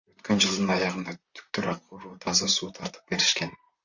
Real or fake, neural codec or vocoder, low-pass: fake; vocoder, 44.1 kHz, 128 mel bands, Pupu-Vocoder; 7.2 kHz